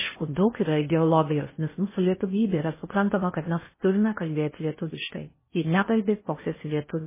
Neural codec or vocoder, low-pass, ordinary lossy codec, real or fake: codec, 16 kHz in and 24 kHz out, 0.6 kbps, FocalCodec, streaming, 4096 codes; 3.6 kHz; MP3, 16 kbps; fake